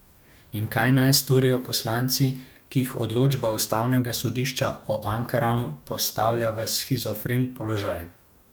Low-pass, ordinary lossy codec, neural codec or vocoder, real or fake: none; none; codec, 44.1 kHz, 2.6 kbps, DAC; fake